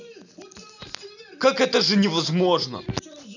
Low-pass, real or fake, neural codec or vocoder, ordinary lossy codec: 7.2 kHz; real; none; none